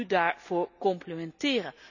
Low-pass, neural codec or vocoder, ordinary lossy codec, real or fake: 7.2 kHz; none; none; real